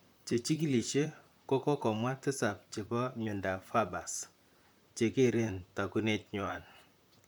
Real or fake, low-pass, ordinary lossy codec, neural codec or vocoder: fake; none; none; vocoder, 44.1 kHz, 128 mel bands, Pupu-Vocoder